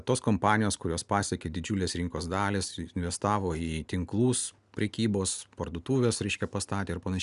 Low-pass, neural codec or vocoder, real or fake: 10.8 kHz; none; real